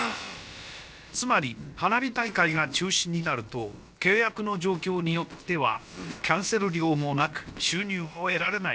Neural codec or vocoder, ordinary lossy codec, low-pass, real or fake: codec, 16 kHz, about 1 kbps, DyCAST, with the encoder's durations; none; none; fake